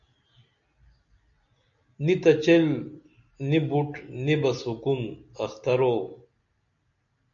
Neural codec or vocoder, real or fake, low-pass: none; real; 7.2 kHz